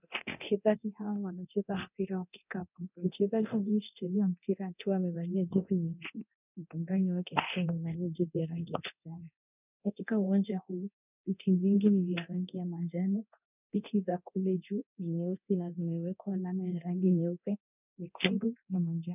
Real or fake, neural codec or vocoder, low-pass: fake; codec, 24 kHz, 0.9 kbps, DualCodec; 3.6 kHz